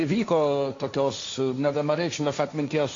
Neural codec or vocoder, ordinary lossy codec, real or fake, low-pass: codec, 16 kHz, 1.1 kbps, Voila-Tokenizer; AAC, 48 kbps; fake; 7.2 kHz